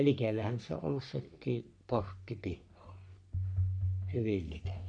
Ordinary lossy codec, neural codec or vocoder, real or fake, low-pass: none; codec, 44.1 kHz, 3.4 kbps, Pupu-Codec; fake; 9.9 kHz